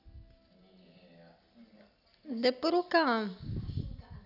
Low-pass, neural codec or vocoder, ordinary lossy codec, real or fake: 5.4 kHz; none; none; real